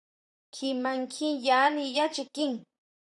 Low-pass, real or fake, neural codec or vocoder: 10.8 kHz; fake; vocoder, 44.1 kHz, 128 mel bands, Pupu-Vocoder